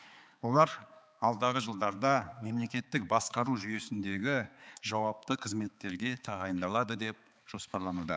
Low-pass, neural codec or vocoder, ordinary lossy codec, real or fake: none; codec, 16 kHz, 4 kbps, X-Codec, HuBERT features, trained on balanced general audio; none; fake